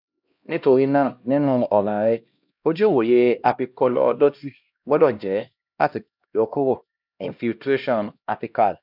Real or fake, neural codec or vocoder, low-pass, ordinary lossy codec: fake; codec, 16 kHz, 1 kbps, X-Codec, HuBERT features, trained on LibriSpeech; 5.4 kHz; none